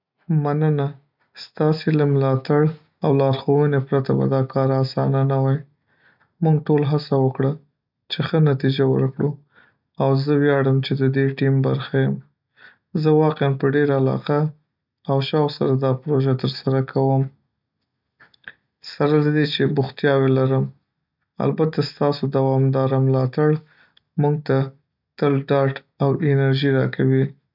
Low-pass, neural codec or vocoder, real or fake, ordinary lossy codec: 5.4 kHz; none; real; none